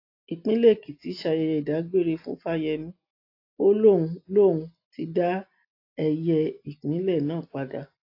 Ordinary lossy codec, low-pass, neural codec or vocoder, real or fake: AAC, 32 kbps; 5.4 kHz; none; real